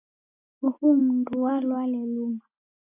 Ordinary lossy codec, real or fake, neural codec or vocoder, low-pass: AAC, 32 kbps; real; none; 3.6 kHz